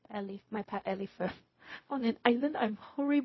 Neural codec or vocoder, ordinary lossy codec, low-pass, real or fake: codec, 16 kHz, 0.4 kbps, LongCat-Audio-Codec; MP3, 24 kbps; 7.2 kHz; fake